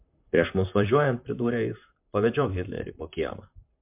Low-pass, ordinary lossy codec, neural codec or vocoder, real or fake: 3.6 kHz; MP3, 32 kbps; vocoder, 44.1 kHz, 128 mel bands, Pupu-Vocoder; fake